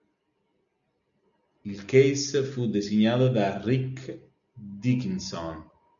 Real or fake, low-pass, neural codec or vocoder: real; 7.2 kHz; none